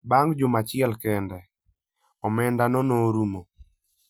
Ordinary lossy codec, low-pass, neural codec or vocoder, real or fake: none; none; none; real